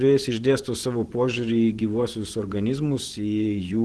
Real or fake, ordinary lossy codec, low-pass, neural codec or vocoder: fake; Opus, 16 kbps; 10.8 kHz; vocoder, 24 kHz, 100 mel bands, Vocos